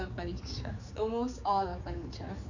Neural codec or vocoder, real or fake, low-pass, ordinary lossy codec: codec, 16 kHz, 4 kbps, X-Codec, HuBERT features, trained on general audio; fake; 7.2 kHz; none